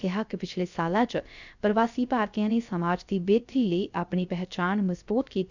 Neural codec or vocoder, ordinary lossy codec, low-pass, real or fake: codec, 16 kHz, 0.3 kbps, FocalCodec; none; 7.2 kHz; fake